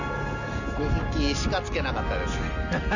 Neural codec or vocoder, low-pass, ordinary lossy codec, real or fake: none; 7.2 kHz; none; real